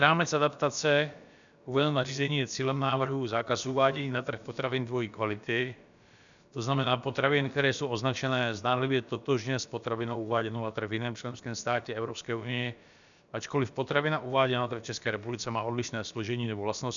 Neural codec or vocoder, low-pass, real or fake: codec, 16 kHz, about 1 kbps, DyCAST, with the encoder's durations; 7.2 kHz; fake